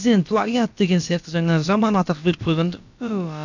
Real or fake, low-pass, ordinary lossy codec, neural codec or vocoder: fake; 7.2 kHz; none; codec, 16 kHz, about 1 kbps, DyCAST, with the encoder's durations